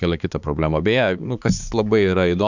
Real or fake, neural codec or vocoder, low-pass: fake; codec, 16 kHz, 4 kbps, X-Codec, HuBERT features, trained on balanced general audio; 7.2 kHz